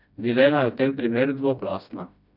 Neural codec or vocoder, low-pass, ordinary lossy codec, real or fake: codec, 16 kHz, 1 kbps, FreqCodec, smaller model; 5.4 kHz; none; fake